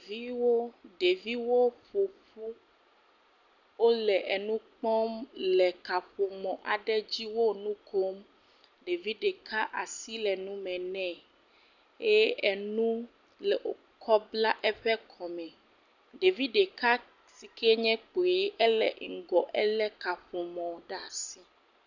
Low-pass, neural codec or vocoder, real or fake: 7.2 kHz; none; real